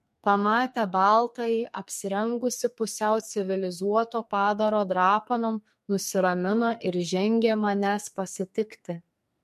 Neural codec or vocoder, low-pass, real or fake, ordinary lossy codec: codec, 44.1 kHz, 2.6 kbps, SNAC; 14.4 kHz; fake; MP3, 64 kbps